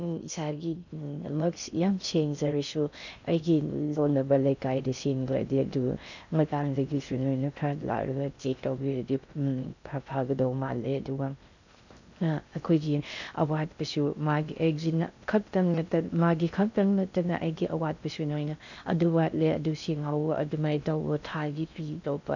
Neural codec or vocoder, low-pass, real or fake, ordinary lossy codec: codec, 16 kHz in and 24 kHz out, 0.6 kbps, FocalCodec, streaming, 2048 codes; 7.2 kHz; fake; none